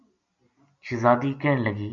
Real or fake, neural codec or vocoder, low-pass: real; none; 7.2 kHz